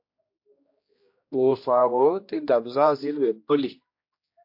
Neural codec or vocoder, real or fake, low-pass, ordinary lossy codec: codec, 16 kHz, 1 kbps, X-Codec, HuBERT features, trained on general audio; fake; 5.4 kHz; MP3, 32 kbps